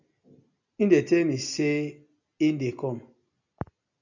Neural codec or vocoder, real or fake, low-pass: none; real; 7.2 kHz